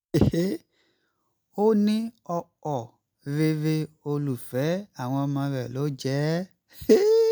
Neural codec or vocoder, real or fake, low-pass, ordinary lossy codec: none; real; none; none